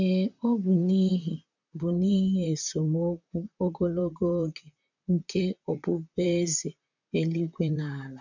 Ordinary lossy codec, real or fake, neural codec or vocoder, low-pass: none; fake; vocoder, 44.1 kHz, 128 mel bands, Pupu-Vocoder; 7.2 kHz